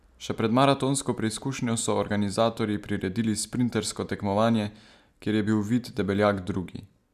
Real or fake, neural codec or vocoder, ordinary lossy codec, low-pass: real; none; none; 14.4 kHz